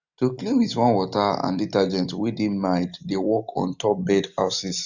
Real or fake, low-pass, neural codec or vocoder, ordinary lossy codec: real; 7.2 kHz; none; none